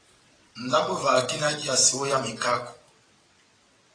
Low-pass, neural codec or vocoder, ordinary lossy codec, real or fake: 9.9 kHz; vocoder, 22.05 kHz, 80 mel bands, WaveNeXt; AAC, 32 kbps; fake